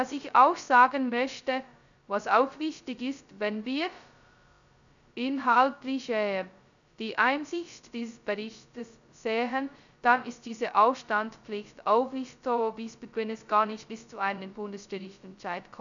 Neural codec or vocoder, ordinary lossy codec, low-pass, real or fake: codec, 16 kHz, 0.2 kbps, FocalCodec; none; 7.2 kHz; fake